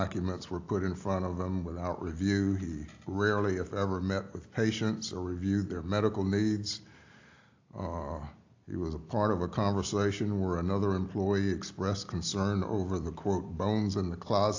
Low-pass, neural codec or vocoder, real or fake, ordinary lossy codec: 7.2 kHz; none; real; AAC, 48 kbps